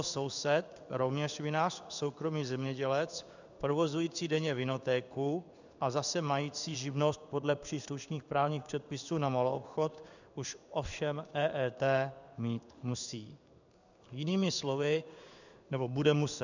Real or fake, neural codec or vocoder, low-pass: fake; codec, 16 kHz in and 24 kHz out, 1 kbps, XY-Tokenizer; 7.2 kHz